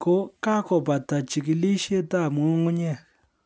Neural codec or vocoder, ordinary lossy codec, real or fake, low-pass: none; none; real; none